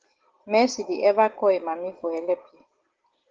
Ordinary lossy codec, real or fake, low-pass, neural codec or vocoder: Opus, 16 kbps; real; 7.2 kHz; none